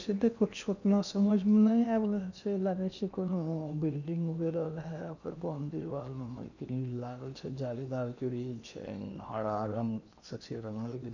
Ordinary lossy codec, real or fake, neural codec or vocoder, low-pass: none; fake; codec, 16 kHz in and 24 kHz out, 0.8 kbps, FocalCodec, streaming, 65536 codes; 7.2 kHz